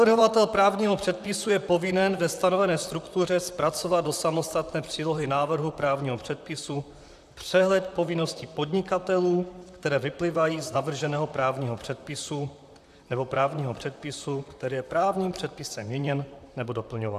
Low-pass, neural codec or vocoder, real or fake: 14.4 kHz; vocoder, 44.1 kHz, 128 mel bands, Pupu-Vocoder; fake